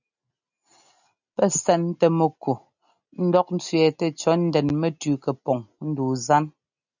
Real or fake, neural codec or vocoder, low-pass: real; none; 7.2 kHz